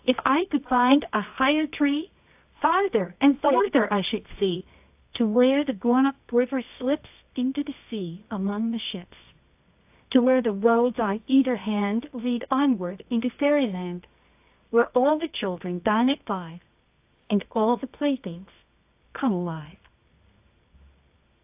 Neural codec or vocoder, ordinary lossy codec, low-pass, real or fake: codec, 24 kHz, 0.9 kbps, WavTokenizer, medium music audio release; AAC, 32 kbps; 3.6 kHz; fake